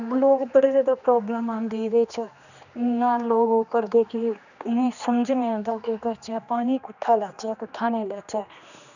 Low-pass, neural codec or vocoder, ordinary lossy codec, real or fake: 7.2 kHz; codec, 16 kHz, 2 kbps, X-Codec, HuBERT features, trained on general audio; none; fake